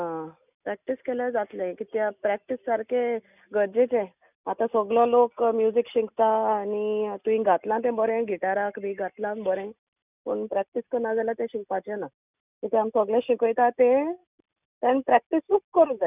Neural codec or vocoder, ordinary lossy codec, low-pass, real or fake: none; none; 3.6 kHz; real